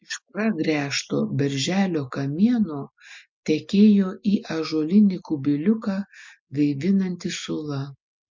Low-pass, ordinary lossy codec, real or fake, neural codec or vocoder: 7.2 kHz; MP3, 48 kbps; real; none